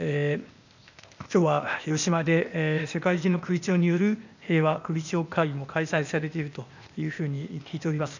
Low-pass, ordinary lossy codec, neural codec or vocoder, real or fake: 7.2 kHz; none; codec, 16 kHz, 0.8 kbps, ZipCodec; fake